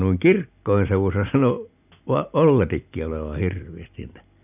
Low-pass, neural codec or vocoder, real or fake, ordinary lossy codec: 3.6 kHz; none; real; none